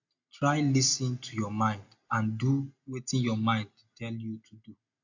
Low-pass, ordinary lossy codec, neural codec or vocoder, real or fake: 7.2 kHz; AAC, 48 kbps; none; real